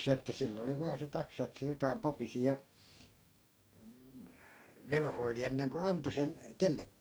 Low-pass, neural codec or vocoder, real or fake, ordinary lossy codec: none; codec, 44.1 kHz, 2.6 kbps, DAC; fake; none